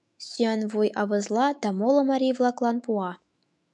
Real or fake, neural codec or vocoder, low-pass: fake; autoencoder, 48 kHz, 128 numbers a frame, DAC-VAE, trained on Japanese speech; 10.8 kHz